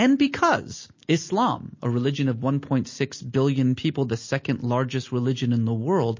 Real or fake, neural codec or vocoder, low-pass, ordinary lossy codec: real; none; 7.2 kHz; MP3, 32 kbps